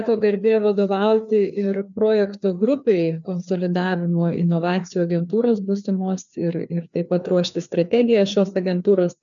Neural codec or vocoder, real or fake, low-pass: codec, 16 kHz, 2 kbps, FreqCodec, larger model; fake; 7.2 kHz